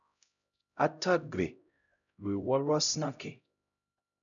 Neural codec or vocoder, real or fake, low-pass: codec, 16 kHz, 0.5 kbps, X-Codec, HuBERT features, trained on LibriSpeech; fake; 7.2 kHz